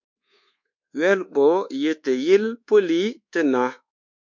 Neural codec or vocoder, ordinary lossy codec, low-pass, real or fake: codec, 24 kHz, 1.2 kbps, DualCodec; MP3, 48 kbps; 7.2 kHz; fake